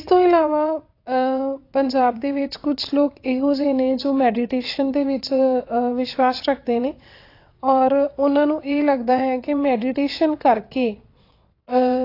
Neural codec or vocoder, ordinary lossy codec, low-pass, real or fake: vocoder, 22.05 kHz, 80 mel bands, WaveNeXt; AAC, 32 kbps; 5.4 kHz; fake